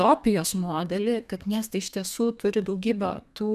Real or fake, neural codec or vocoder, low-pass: fake; codec, 32 kHz, 1.9 kbps, SNAC; 14.4 kHz